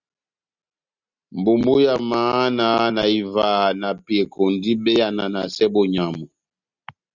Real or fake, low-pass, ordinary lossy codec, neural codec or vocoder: real; 7.2 kHz; Opus, 64 kbps; none